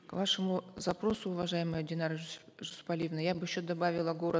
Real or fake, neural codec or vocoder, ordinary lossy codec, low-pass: real; none; none; none